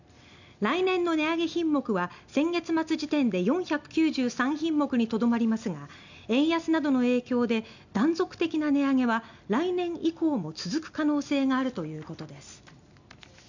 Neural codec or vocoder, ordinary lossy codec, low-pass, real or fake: none; none; 7.2 kHz; real